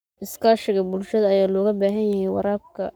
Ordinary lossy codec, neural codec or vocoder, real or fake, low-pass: none; codec, 44.1 kHz, 7.8 kbps, Pupu-Codec; fake; none